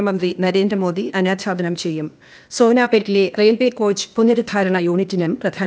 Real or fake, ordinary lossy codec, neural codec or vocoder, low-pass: fake; none; codec, 16 kHz, 0.8 kbps, ZipCodec; none